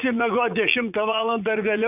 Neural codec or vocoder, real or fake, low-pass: vocoder, 44.1 kHz, 80 mel bands, Vocos; fake; 3.6 kHz